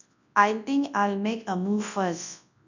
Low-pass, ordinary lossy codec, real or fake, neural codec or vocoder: 7.2 kHz; none; fake; codec, 24 kHz, 0.9 kbps, WavTokenizer, large speech release